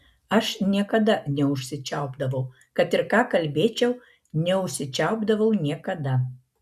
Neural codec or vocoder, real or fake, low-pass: none; real; 14.4 kHz